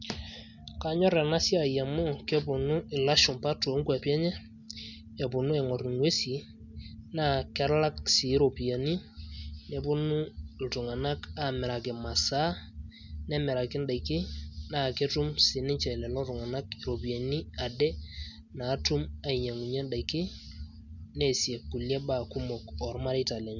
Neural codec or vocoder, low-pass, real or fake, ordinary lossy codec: none; 7.2 kHz; real; none